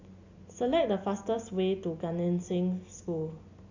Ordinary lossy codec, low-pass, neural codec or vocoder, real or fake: none; 7.2 kHz; none; real